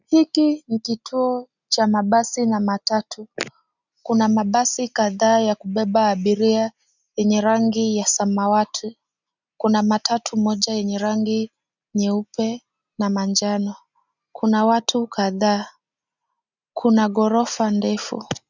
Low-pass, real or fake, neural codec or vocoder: 7.2 kHz; real; none